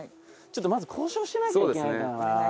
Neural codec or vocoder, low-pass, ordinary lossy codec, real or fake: none; none; none; real